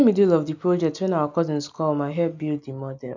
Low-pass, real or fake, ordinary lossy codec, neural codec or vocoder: 7.2 kHz; real; none; none